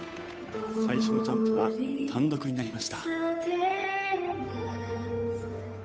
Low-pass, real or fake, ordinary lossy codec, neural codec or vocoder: none; fake; none; codec, 16 kHz, 8 kbps, FunCodec, trained on Chinese and English, 25 frames a second